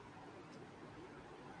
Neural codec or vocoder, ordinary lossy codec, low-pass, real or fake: none; MP3, 96 kbps; 9.9 kHz; real